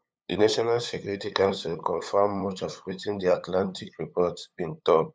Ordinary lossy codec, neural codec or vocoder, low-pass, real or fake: none; codec, 16 kHz, 8 kbps, FunCodec, trained on LibriTTS, 25 frames a second; none; fake